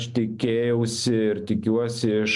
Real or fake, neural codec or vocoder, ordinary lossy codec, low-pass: real; none; MP3, 96 kbps; 10.8 kHz